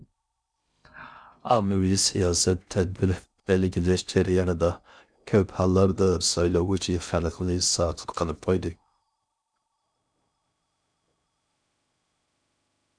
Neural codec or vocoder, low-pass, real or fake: codec, 16 kHz in and 24 kHz out, 0.6 kbps, FocalCodec, streaming, 2048 codes; 9.9 kHz; fake